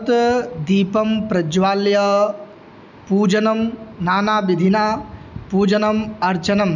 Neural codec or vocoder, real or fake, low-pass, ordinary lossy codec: none; real; 7.2 kHz; none